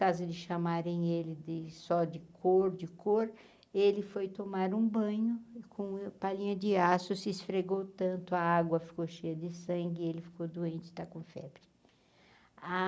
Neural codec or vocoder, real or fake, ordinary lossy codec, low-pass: none; real; none; none